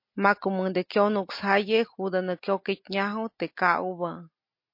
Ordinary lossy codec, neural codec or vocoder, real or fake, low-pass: MP3, 32 kbps; none; real; 5.4 kHz